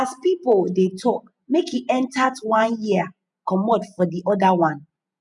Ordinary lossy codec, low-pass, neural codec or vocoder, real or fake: none; 10.8 kHz; vocoder, 48 kHz, 128 mel bands, Vocos; fake